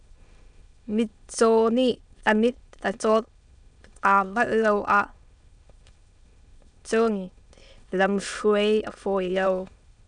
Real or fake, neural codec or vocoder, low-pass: fake; autoencoder, 22.05 kHz, a latent of 192 numbers a frame, VITS, trained on many speakers; 9.9 kHz